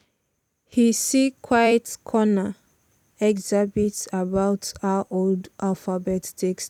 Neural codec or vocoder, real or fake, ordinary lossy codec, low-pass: vocoder, 44.1 kHz, 128 mel bands every 256 samples, BigVGAN v2; fake; none; 19.8 kHz